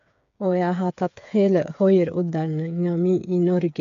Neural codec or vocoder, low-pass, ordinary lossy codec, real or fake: codec, 16 kHz, 8 kbps, FreqCodec, smaller model; 7.2 kHz; MP3, 64 kbps; fake